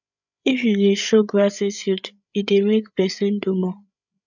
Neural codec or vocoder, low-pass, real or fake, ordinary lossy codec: codec, 16 kHz, 8 kbps, FreqCodec, larger model; 7.2 kHz; fake; none